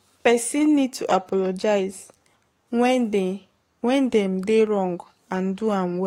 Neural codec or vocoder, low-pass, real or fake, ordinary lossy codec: codec, 44.1 kHz, 7.8 kbps, DAC; 19.8 kHz; fake; AAC, 48 kbps